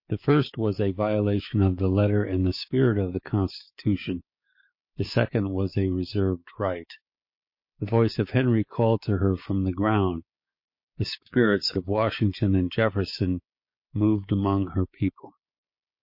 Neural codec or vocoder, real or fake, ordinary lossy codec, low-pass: codec, 16 kHz, 6 kbps, DAC; fake; MP3, 32 kbps; 5.4 kHz